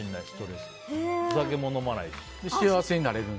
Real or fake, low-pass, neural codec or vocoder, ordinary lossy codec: real; none; none; none